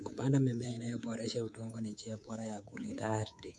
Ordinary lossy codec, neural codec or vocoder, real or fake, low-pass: none; codec, 24 kHz, 3.1 kbps, DualCodec; fake; none